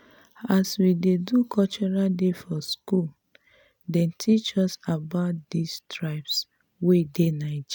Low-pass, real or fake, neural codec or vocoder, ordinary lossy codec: none; real; none; none